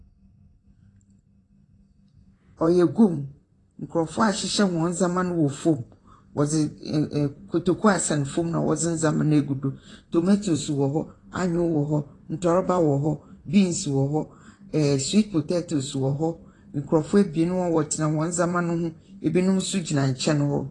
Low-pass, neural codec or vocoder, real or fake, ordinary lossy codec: 10.8 kHz; vocoder, 44.1 kHz, 128 mel bands, Pupu-Vocoder; fake; AAC, 32 kbps